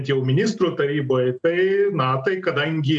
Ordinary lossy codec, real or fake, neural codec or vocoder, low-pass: MP3, 96 kbps; real; none; 9.9 kHz